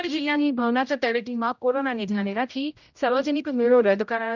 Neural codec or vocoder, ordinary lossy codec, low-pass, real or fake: codec, 16 kHz, 0.5 kbps, X-Codec, HuBERT features, trained on general audio; none; 7.2 kHz; fake